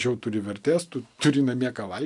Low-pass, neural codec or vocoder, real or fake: 10.8 kHz; none; real